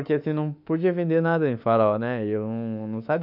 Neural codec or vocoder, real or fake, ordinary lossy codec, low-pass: autoencoder, 48 kHz, 32 numbers a frame, DAC-VAE, trained on Japanese speech; fake; none; 5.4 kHz